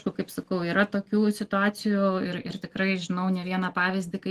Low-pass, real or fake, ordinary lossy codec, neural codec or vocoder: 14.4 kHz; real; Opus, 16 kbps; none